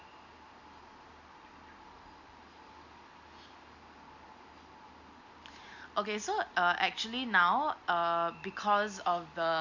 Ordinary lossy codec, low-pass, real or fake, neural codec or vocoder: Opus, 64 kbps; 7.2 kHz; real; none